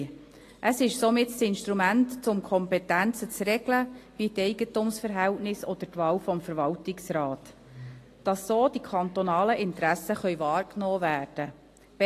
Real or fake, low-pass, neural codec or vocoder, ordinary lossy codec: real; 14.4 kHz; none; AAC, 48 kbps